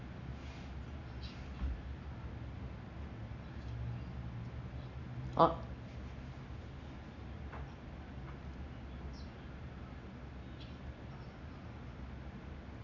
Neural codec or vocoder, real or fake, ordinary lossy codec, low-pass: none; real; none; 7.2 kHz